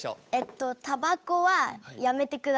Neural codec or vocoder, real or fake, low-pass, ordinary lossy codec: codec, 16 kHz, 8 kbps, FunCodec, trained on Chinese and English, 25 frames a second; fake; none; none